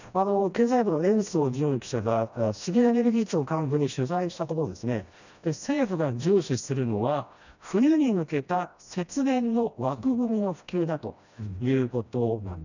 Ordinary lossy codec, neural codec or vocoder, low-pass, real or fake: none; codec, 16 kHz, 1 kbps, FreqCodec, smaller model; 7.2 kHz; fake